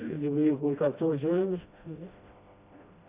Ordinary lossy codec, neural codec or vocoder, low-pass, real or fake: Opus, 64 kbps; codec, 16 kHz, 1 kbps, FreqCodec, smaller model; 3.6 kHz; fake